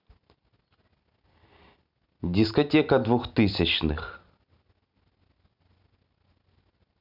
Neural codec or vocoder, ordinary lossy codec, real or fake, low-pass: none; none; real; 5.4 kHz